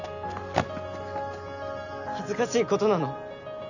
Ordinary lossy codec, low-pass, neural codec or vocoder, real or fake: none; 7.2 kHz; none; real